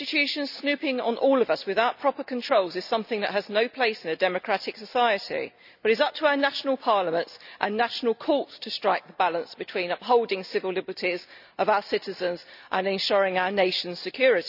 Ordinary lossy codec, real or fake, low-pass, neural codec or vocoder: none; real; 5.4 kHz; none